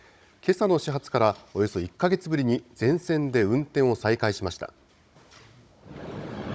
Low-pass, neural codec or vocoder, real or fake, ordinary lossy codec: none; codec, 16 kHz, 16 kbps, FunCodec, trained on Chinese and English, 50 frames a second; fake; none